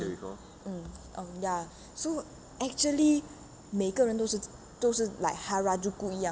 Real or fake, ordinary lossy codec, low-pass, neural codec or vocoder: real; none; none; none